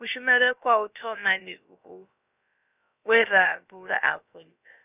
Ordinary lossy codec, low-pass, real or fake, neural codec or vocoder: none; 3.6 kHz; fake; codec, 16 kHz, about 1 kbps, DyCAST, with the encoder's durations